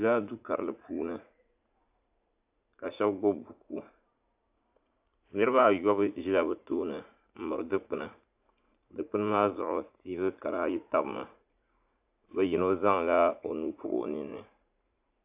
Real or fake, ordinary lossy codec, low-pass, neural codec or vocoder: fake; AAC, 32 kbps; 3.6 kHz; vocoder, 44.1 kHz, 80 mel bands, Vocos